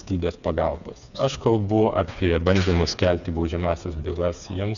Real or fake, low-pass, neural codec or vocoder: fake; 7.2 kHz; codec, 16 kHz, 4 kbps, FreqCodec, smaller model